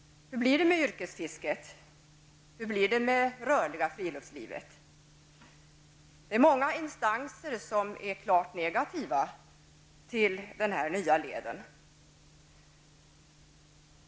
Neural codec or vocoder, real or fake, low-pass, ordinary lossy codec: none; real; none; none